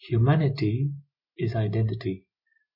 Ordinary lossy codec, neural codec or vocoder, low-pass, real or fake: AAC, 48 kbps; none; 5.4 kHz; real